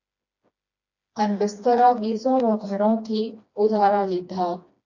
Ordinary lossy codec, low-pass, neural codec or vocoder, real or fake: AAC, 48 kbps; 7.2 kHz; codec, 16 kHz, 2 kbps, FreqCodec, smaller model; fake